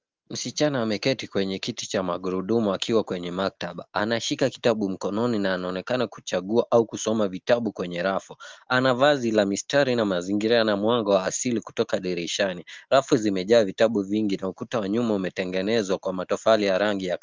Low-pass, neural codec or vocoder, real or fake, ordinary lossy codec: 7.2 kHz; none; real; Opus, 32 kbps